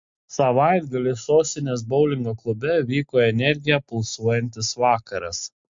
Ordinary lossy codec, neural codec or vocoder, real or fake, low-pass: MP3, 48 kbps; none; real; 7.2 kHz